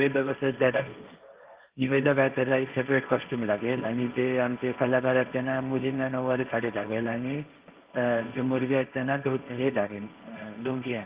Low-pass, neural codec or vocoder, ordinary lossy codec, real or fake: 3.6 kHz; codec, 16 kHz, 1.1 kbps, Voila-Tokenizer; Opus, 24 kbps; fake